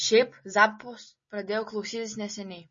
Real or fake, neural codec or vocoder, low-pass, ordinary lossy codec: real; none; 7.2 kHz; MP3, 32 kbps